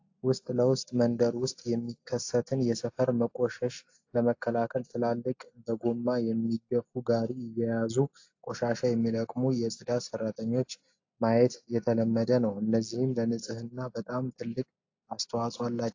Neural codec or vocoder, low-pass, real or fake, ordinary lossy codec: none; 7.2 kHz; real; AAC, 48 kbps